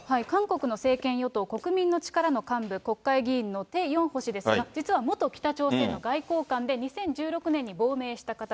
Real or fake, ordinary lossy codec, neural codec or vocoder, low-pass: real; none; none; none